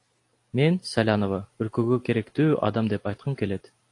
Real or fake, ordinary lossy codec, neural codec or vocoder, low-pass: real; AAC, 48 kbps; none; 10.8 kHz